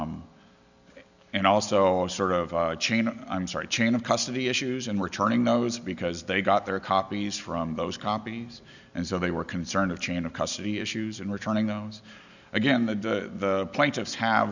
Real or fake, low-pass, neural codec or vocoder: real; 7.2 kHz; none